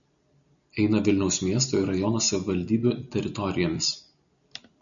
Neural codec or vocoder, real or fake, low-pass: none; real; 7.2 kHz